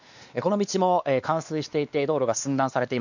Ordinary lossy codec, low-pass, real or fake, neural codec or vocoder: none; 7.2 kHz; fake; codec, 16 kHz, 2 kbps, X-Codec, WavLM features, trained on Multilingual LibriSpeech